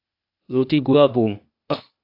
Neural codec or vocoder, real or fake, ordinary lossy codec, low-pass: codec, 16 kHz, 0.8 kbps, ZipCodec; fake; AAC, 32 kbps; 5.4 kHz